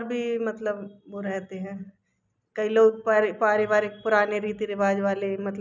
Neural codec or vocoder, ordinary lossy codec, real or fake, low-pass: none; none; real; 7.2 kHz